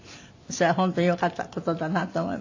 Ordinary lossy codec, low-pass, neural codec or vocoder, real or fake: AAC, 48 kbps; 7.2 kHz; none; real